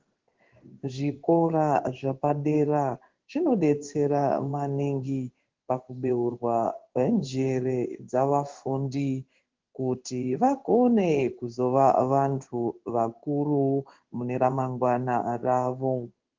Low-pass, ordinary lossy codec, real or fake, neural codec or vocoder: 7.2 kHz; Opus, 16 kbps; fake; codec, 16 kHz in and 24 kHz out, 1 kbps, XY-Tokenizer